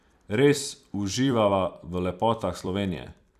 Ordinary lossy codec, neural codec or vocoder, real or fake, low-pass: none; vocoder, 44.1 kHz, 128 mel bands every 256 samples, BigVGAN v2; fake; 14.4 kHz